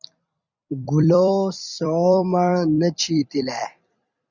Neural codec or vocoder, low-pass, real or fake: vocoder, 44.1 kHz, 128 mel bands every 256 samples, BigVGAN v2; 7.2 kHz; fake